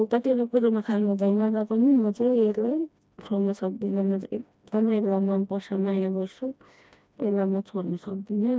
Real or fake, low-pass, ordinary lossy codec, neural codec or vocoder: fake; none; none; codec, 16 kHz, 1 kbps, FreqCodec, smaller model